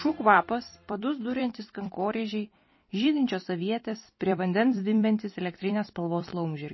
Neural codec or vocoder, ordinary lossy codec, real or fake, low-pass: vocoder, 44.1 kHz, 128 mel bands every 256 samples, BigVGAN v2; MP3, 24 kbps; fake; 7.2 kHz